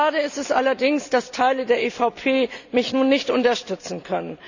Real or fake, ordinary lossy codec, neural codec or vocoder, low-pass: real; none; none; 7.2 kHz